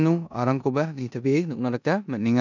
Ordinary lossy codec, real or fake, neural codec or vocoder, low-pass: none; fake; codec, 16 kHz in and 24 kHz out, 0.9 kbps, LongCat-Audio-Codec, four codebook decoder; 7.2 kHz